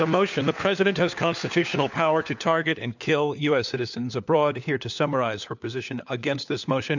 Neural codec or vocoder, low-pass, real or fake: codec, 16 kHz, 4 kbps, FunCodec, trained on LibriTTS, 50 frames a second; 7.2 kHz; fake